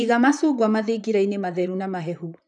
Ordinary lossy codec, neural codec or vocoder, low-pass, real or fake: none; vocoder, 44.1 kHz, 128 mel bands, Pupu-Vocoder; 10.8 kHz; fake